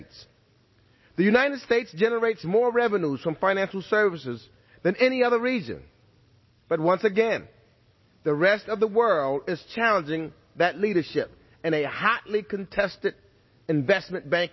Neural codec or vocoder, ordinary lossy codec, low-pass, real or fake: none; MP3, 24 kbps; 7.2 kHz; real